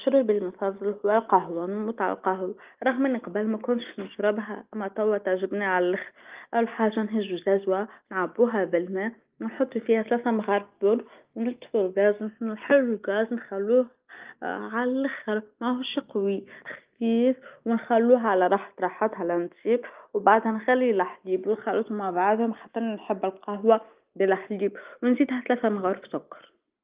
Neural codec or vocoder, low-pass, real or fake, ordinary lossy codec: none; 3.6 kHz; real; Opus, 32 kbps